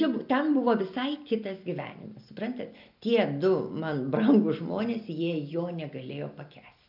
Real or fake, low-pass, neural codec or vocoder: real; 5.4 kHz; none